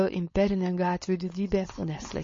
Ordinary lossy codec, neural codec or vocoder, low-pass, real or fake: MP3, 32 kbps; codec, 16 kHz, 4.8 kbps, FACodec; 7.2 kHz; fake